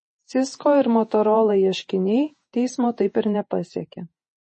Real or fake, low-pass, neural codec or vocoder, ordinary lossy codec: fake; 10.8 kHz; vocoder, 48 kHz, 128 mel bands, Vocos; MP3, 32 kbps